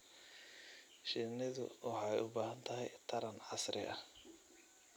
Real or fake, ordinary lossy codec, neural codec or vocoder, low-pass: real; none; none; none